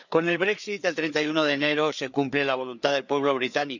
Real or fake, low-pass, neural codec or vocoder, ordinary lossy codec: fake; 7.2 kHz; codec, 16 kHz, 4 kbps, FreqCodec, larger model; none